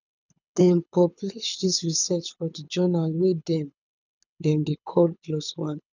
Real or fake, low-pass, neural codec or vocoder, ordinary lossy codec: fake; 7.2 kHz; codec, 24 kHz, 6 kbps, HILCodec; none